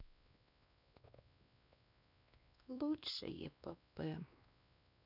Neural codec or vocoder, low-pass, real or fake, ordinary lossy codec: codec, 16 kHz, 2 kbps, X-Codec, WavLM features, trained on Multilingual LibriSpeech; 5.4 kHz; fake; none